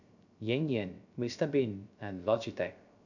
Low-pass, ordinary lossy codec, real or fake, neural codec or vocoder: 7.2 kHz; none; fake; codec, 16 kHz, 0.3 kbps, FocalCodec